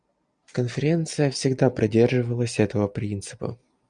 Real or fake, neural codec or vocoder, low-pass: real; none; 9.9 kHz